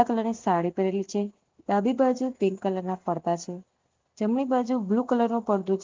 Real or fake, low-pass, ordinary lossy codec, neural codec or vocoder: real; 7.2 kHz; Opus, 24 kbps; none